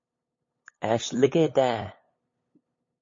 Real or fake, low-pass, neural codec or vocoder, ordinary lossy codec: fake; 7.2 kHz; codec, 16 kHz, 8 kbps, FunCodec, trained on LibriTTS, 25 frames a second; MP3, 32 kbps